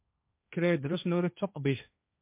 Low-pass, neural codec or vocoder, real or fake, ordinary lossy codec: 3.6 kHz; codec, 16 kHz, 1.1 kbps, Voila-Tokenizer; fake; MP3, 32 kbps